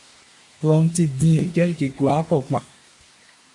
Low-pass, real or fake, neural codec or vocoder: 10.8 kHz; fake; codec, 24 kHz, 1 kbps, SNAC